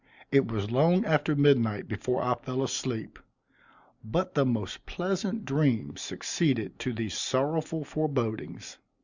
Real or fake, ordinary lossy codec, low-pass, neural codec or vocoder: real; Opus, 64 kbps; 7.2 kHz; none